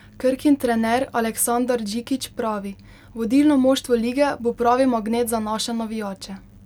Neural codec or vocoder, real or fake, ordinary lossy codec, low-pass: none; real; none; 19.8 kHz